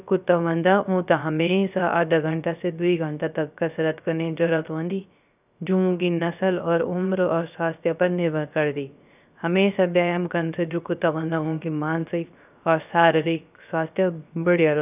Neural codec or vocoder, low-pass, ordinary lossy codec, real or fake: codec, 16 kHz, 0.3 kbps, FocalCodec; 3.6 kHz; none; fake